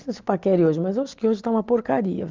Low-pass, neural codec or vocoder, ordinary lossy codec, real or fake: 7.2 kHz; none; Opus, 32 kbps; real